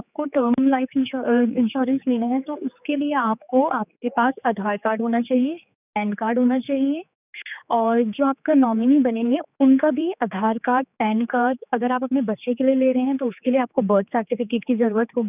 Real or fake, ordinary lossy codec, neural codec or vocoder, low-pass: fake; none; codec, 16 kHz, 4 kbps, X-Codec, HuBERT features, trained on general audio; 3.6 kHz